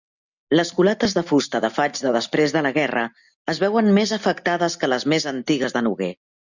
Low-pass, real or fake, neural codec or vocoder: 7.2 kHz; real; none